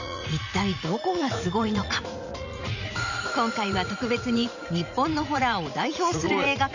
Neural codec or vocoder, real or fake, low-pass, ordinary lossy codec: vocoder, 44.1 kHz, 80 mel bands, Vocos; fake; 7.2 kHz; none